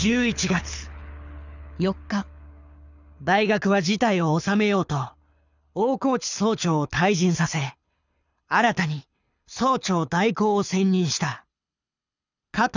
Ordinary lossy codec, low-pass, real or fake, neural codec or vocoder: none; 7.2 kHz; fake; codec, 24 kHz, 6 kbps, HILCodec